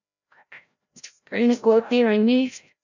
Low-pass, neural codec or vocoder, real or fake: 7.2 kHz; codec, 16 kHz, 0.5 kbps, FreqCodec, larger model; fake